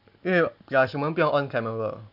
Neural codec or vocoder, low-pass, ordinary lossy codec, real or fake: none; 5.4 kHz; none; real